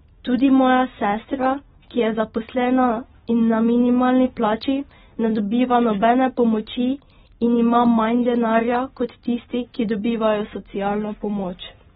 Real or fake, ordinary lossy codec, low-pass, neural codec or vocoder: real; AAC, 16 kbps; 7.2 kHz; none